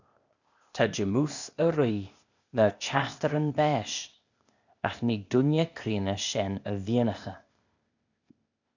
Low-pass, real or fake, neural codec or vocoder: 7.2 kHz; fake; codec, 16 kHz, 0.8 kbps, ZipCodec